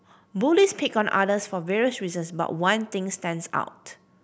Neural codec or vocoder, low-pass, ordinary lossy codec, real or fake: none; none; none; real